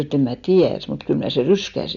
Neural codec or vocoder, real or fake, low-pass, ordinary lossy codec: none; real; 7.2 kHz; none